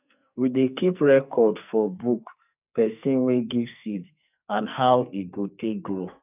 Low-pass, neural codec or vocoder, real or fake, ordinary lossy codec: 3.6 kHz; codec, 44.1 kHz, 2.6 kbps, SNAC; fake; none